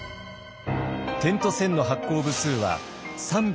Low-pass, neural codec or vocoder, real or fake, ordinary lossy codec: none; none; real; none